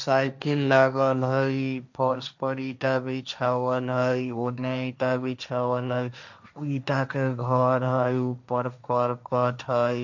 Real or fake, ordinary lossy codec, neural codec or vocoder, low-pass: fake; none; codec, 16 kHz, 1.1 kbps, Voila-Tokenizer; 7.2 kHz